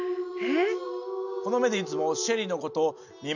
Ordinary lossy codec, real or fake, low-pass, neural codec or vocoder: none; real; 7.2 kHz; none